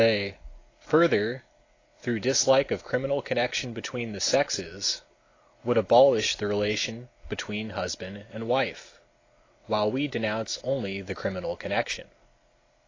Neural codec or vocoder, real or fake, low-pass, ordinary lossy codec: none; real; 7.2 kHz; AAC, 32 kbps